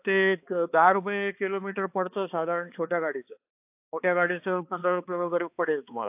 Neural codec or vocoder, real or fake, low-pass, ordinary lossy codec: codec, 16 kHz, 2 kbps, X-Codec, HuBERT features, trained on balanced general audio; fake; 3.6 kHz; AAC, 32 kbps